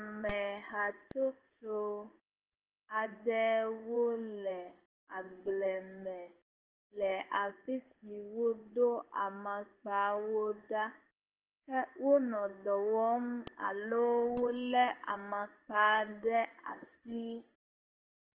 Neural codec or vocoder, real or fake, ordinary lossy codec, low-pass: codec, 16 kHz in and 24 kHz out, 1 kbps, XY-Tokenizer; fake; Opus, 16 kbps; 3.6 kHz